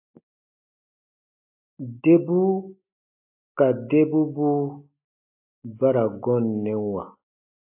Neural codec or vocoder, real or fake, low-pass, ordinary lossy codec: none; real; 3.6 kHz; AAC, 32 kbps